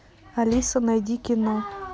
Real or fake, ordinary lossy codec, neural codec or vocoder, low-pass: real; none; none; none